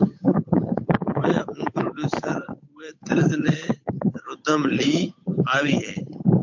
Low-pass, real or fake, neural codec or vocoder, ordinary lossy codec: 7.2 kHz; fake; codec, 16 kHz, 8 kbps, FunCodec, trained on Chinese and English, 25 frames a second; MP3, 48 kbps